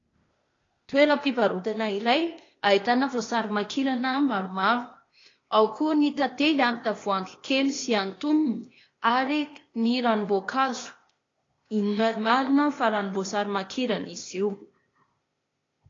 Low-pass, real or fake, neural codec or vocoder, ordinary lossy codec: 7.2 kHz; fake; codec, 16 kHz, 0.8 kbps, ZipCodec; AAC, 32 kbps